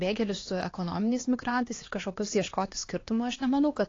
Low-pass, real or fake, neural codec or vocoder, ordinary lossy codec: 7.2 kHz; fake; codec, 16 kHz, 2 kbps, X-Codec, HuBERT features, trained on LibriSpeech; AAC, 32 kbps